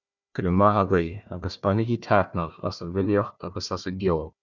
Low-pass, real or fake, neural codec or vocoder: 7.2 kHz; fake; codec, 16 kHz, 1 kbps, FunCodec, trained on Chinese and English, 50 frames a second